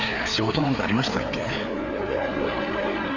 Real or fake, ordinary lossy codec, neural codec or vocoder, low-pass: fake; none; codec, 16 kHz, 4 kbps, FreqCodec, larger model; 7.2 kHz